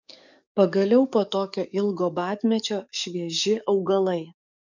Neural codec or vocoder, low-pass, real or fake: codec, 44.1 kHz, 7.8 kbps, DAC; 7.2 kHz; fake